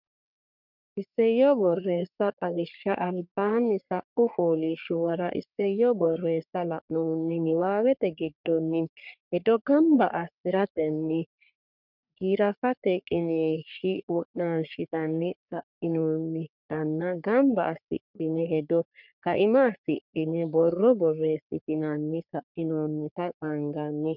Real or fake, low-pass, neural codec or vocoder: fake; 5.4 kHz; codec, 44.1 kHz, 3.4 kbps, Pupu-Codec